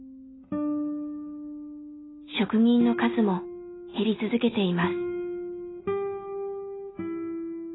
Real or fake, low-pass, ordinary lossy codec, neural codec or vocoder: real; 7.2 kHz; AAC, 16 kbps; none